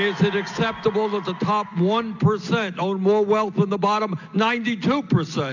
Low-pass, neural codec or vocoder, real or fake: 7.2 kHz; none; real